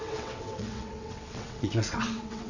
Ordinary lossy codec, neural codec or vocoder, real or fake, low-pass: none; vocoder, 22.05 kHz, 80 mel bands, WaveNeXt; fake; 7.2 kHz